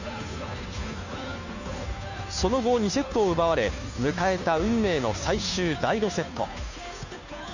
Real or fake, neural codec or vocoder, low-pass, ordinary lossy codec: fake; codec, 16 kHz, 2 kbps, FunCodec, trained on Chinese and English, 25 frames a second; 7.2 kHz; none